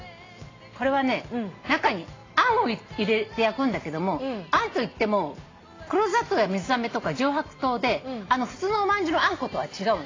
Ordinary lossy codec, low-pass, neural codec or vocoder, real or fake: AAC, 32 kbps; 7.2 kHz; none; real